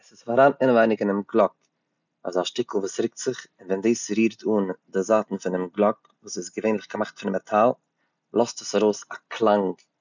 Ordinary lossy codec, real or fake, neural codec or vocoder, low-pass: none; real; none; 7.2 kHz